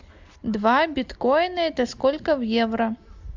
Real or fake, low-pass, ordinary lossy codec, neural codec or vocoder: real; 7.2 kHz; MP3, 64 kbps; none